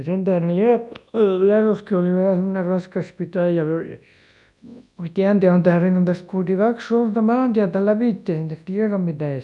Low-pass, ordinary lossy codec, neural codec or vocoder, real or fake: 10.8 kHz; none; codec, 24 kHz, 0.9 kbps, WavTokenizer, large speech release; fake